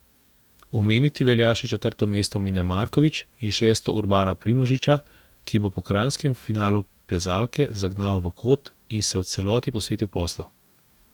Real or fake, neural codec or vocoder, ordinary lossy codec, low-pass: fake; codec, 44.1 kHz, 2.6 kbps, DAC; none; 19.8 kHz